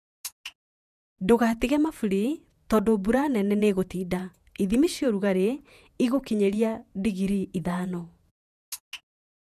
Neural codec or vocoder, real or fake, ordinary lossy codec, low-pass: vocoder, 48 kHz, 128 mel bands, Vocos; fake; none; 14.4 kHz